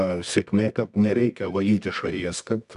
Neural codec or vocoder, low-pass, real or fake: codec, 24 kHz, 0.9 kbps, WavTokenizer, medium music audio release; 10.8 kHz; fake